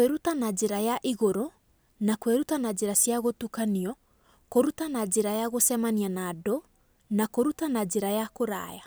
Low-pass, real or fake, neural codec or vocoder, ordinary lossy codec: none; real; none; none